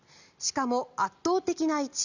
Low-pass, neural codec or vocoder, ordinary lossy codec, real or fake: 7.2 kHz; none; none; real